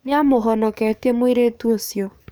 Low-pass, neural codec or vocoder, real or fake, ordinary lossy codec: none; codec, 44.1 kHz, 7.8 kbps, DAC; fake; none